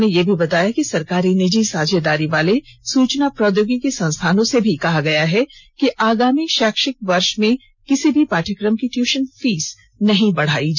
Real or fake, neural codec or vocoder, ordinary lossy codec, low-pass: real; none; none; 7.2 kHz